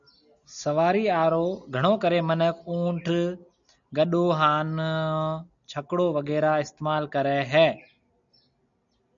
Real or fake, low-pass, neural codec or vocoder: real; 7.2 kHz; none